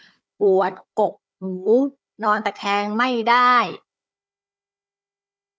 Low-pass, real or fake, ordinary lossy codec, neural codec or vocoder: none; fake; none; codec, 16 kHz, 4 kbps, FunCodec, trained on Chinese and English, 50 frames a second